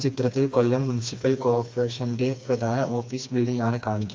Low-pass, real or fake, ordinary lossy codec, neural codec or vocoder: none; fake; none; codec, 16 kHz, 2 kbps, FreqCodec, smaller model